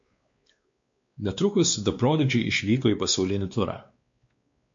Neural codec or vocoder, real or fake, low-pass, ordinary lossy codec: codec, 16 kHz, 2 kbps, X-Codec, WavLM features, trained on Multilingual LibriSpeech; fake; 7.2 kHz; MP3, 48 kbps